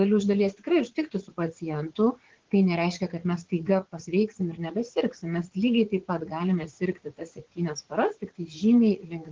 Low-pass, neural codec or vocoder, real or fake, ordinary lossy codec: 7.2 kHz; vocoder, 44.1 kHz, 80 mel bands, Vocos; fake; Opus, 16 kbps